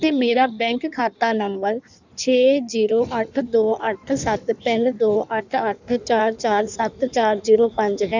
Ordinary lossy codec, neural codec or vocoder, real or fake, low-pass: none; codec, 16 kHz in and 24 kHz out, 1.1 kbps, FireRedTTS-2 codec; fake; 7.2 kHz